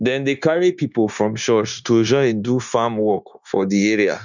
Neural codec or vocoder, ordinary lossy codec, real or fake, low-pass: codec, 16 kHz, 0.9 kbps, LongCat-Audio-Codec; none; fake; 7.2 kHz